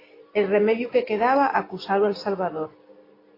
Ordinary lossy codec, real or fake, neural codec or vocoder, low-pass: AAC, 24 kbps; real; none; 5.4 kHz